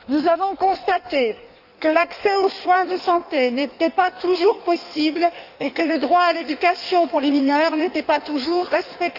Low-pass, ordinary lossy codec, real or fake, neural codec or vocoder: 5.4 kHz; none; fake; codec, 16 kHz in and 24 kHz out, 1.1 kbps, FireRedTTS-2 codec